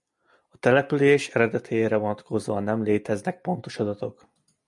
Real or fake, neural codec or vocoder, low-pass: real; none; 10.8 kHz